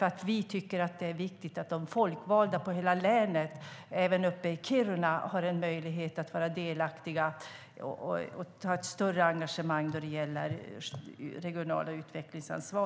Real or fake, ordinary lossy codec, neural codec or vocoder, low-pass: real; none; none; none